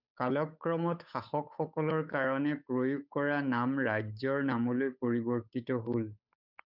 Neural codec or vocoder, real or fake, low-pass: codec, 16 kHz, 8 kbps, FunCodec, trained on Chinese and English, 25 frames a second; fake; 5.4 kHz